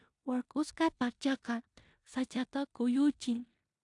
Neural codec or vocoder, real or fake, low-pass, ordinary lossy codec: codec, 16 kHz in and 24 kHz out, 0.9 kbps, LongCat-Audio-Codec, four codebook decoder; fake; 10.8 kHz; AAC, 64 kbps